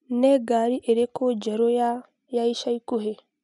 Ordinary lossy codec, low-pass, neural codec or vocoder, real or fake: none; 10.8 kHz; none; real